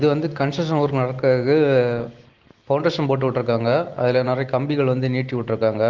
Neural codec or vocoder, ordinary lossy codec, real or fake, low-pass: none; Opus, 16 kbps; real; 7.2 kHz